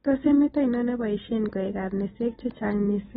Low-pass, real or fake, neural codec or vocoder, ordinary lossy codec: 10.8 kHz; real; none; AAC, 16 kbps